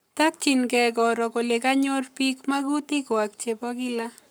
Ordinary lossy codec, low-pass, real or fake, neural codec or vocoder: none; none; fake; codec, 44.1 kHz, 7.8 kbps, Pupu-Codec